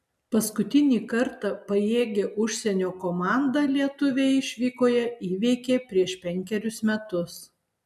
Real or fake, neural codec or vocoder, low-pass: real; none; 14.4 kHz